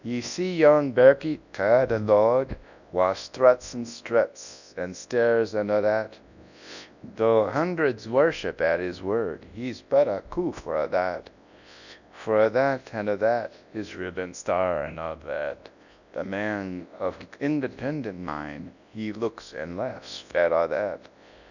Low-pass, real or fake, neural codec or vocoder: 7.2 kHz; fake; codec, 24 kHz, 0.9 kbps, WavTokenizer, large speech release